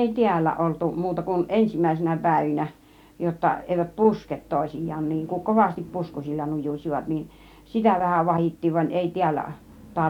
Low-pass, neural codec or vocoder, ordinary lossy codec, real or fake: 19.8 kHz; none; none; real